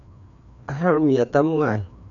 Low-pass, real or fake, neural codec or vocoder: 7.2 kHz; fake; codec, 16 kHz, 2 kbps, FreqCodec, larger model